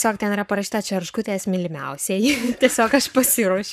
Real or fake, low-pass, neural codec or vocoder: fake; 14.4 kHz; codec, 44.1 kHz, 7.8 kbps, Pupu-Codec